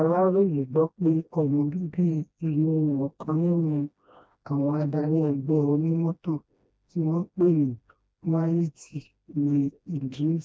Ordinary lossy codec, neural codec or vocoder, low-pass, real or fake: none; codec, 16 kHz, 1 kbps, FreqCodec, smaller model; none; fake